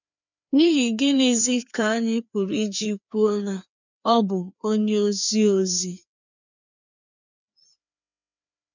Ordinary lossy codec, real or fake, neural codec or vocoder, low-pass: none; fake; codec, 16 kHz, 2 kbps, FreqCodec, larger model; 7.2 kHz